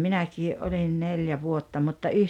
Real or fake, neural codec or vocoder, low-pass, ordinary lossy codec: real; none; 19.8 kHz; none